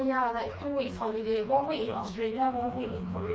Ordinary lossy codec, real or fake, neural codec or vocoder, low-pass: none; fake; codec, 16 kHz, 2 kbps, FreqCodec, smaller model; none